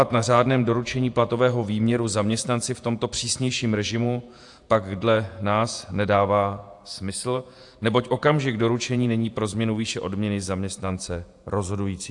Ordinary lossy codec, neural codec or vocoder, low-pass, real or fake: AAC, 64 kbps; vocoder, 48 kHz, 128 mel bands, Vocos; 10.8 kHz; fake